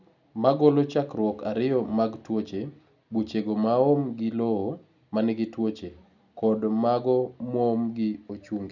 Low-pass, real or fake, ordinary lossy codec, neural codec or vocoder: 7.2 kHz; real; none; none